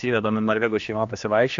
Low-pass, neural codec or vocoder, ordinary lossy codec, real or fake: 7.2 kHz; codec, 16 kHz, 2 kbps, X-Codec, HuBERT features, trained on general audio; AAC, 48 kbps; fake